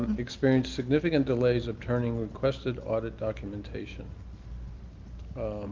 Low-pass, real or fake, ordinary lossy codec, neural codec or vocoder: 7.2 kHz; real; Opus, 32 kbps; none